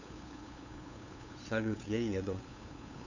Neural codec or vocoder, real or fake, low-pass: codec, 16 kHz, 8 kbps, FunCodec, trained on LibriTTS, 25 frames a second; fake; 7.2 kHz